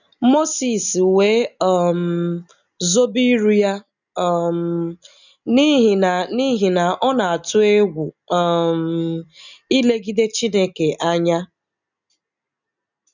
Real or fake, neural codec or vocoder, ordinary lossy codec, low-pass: real; none; none; 7.2 kHz